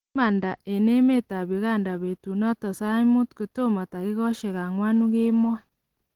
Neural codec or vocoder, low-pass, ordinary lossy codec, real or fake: none; 19.8 kHz; Opus, 16 kbps; real